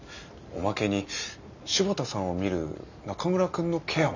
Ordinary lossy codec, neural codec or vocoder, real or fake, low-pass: none; none; real; 7.2 kHz